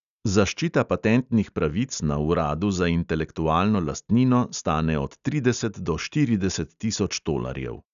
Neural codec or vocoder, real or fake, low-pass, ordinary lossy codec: none; real; 7.2 kHz; none